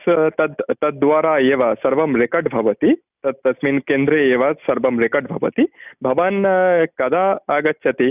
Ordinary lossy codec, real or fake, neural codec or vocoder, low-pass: none; real; none; 3.6 kHz